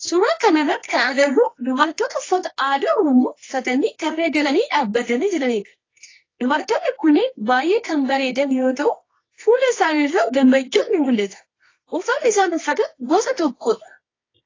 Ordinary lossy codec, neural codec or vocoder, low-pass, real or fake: AAC, 32 kbps; codec, 24 kHz, 0.9 kbps, WavTokenizer, medium music audio release; 7.2 kHz; fake